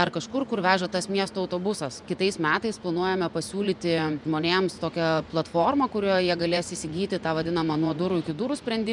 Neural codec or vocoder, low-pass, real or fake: vocoder, 24 kHz, 100 mel bands, Vocos; 10.8 kHz; fake